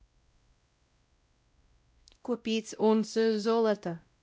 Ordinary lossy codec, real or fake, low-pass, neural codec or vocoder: none; fake; none; codec, 16 kHz, 0.5 kbps, X-Codec, WavLM features, trained on Multilingual LibriSpeech